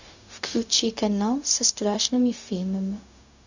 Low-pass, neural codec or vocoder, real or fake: 7.2 kHz; codec, 16 kHz, 0.4 kbps, LongCat-Audio-Codec; fake